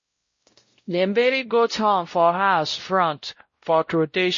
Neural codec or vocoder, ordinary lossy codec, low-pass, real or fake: codec, 16 kHz, 0.5 kbps, X-Codec, WavLM features, trained on Multilingual LibriSpeech; MP3, 32 kbps; 7.2 kHz; fake